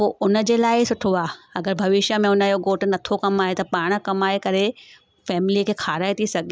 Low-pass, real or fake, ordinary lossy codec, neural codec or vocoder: none; real; none; none